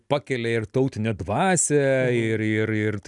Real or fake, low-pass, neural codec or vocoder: real; 10.8 kHz; none